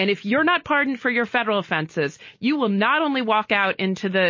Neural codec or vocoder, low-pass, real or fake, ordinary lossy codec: none; 7.2 kHz; real; MP3, 32 kbps